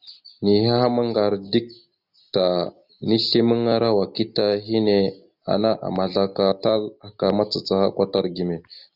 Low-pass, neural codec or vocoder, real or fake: 5.4 kHz; none; real